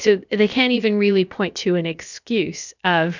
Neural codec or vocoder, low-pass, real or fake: codec, 16 kHz, about 1 kbps, DyCAST, with the encoder's durations; 7.2 kHz; fake